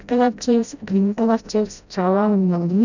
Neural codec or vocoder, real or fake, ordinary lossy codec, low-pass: codec, 16 kHz, 0.5 kbps, FreqCodec, smaller model; fake; none; 7.2 kHz